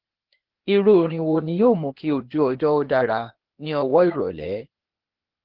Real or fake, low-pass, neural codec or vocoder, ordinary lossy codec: fake; 5.4 kHz; codec, 16 kHz, 0.8 kbps, ZipCodec; Opus, 16 kbps